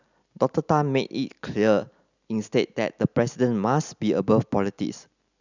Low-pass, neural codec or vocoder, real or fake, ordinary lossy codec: 7.2 kHz; none; real; none